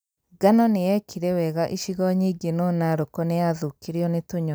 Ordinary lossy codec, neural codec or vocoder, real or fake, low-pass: none; none; real; none